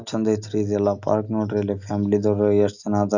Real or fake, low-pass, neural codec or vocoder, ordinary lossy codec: real; 7.2 kHz; none; none